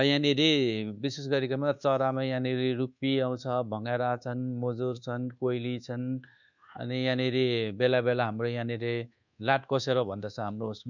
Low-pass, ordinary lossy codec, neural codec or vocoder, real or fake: 7.2 kHz; none; codec, 24 kHz, 1.2 kbps, DualCodec; fake